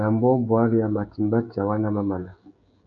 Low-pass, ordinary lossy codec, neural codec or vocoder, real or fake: 7.2 kHz; MP3, 64 kbps; codec, 16 kHz, 16 kbps, FreqCodec, smaller model; fake